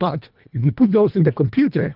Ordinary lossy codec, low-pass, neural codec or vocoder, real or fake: Opus, 24 kbps; 5.4 kHz; codec, 24 kHz, 1.5 kbps, HILCodec; fake